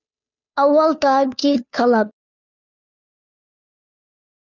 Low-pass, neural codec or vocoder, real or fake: 7.2 kHz; codec, 16 kHz, 2 kbps, FunCodec, trained on Chinese and English, 25 frames a second; fake